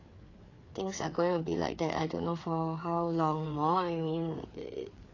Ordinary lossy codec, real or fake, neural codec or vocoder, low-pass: AAC, 32 kbps; fake; codec, 16 kHz, 4 kbps, FreqCodec, larger model; 7.2 kHz